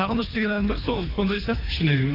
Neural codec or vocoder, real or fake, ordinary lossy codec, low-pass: codec, 24 kHz, 3 kbps, HILCodec; fake; AAC, 24 kbps; 5.4 kHz